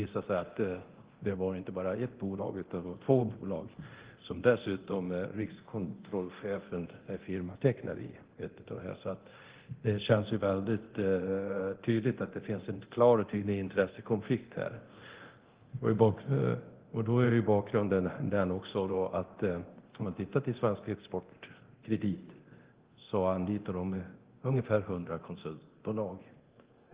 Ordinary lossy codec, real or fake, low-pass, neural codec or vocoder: Opus, 16 kbps; fake; 3.6 kHz; codec, 24 kHz, 0.9 kbps, DualCodec